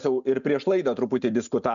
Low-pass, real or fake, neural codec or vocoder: 7.2 kHz; real; none